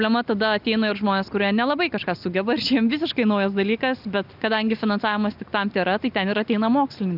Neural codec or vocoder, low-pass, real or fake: none; 5.4 kHz; real